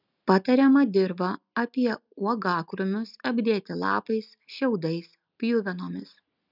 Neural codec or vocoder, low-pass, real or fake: none; 5.4 kHz; real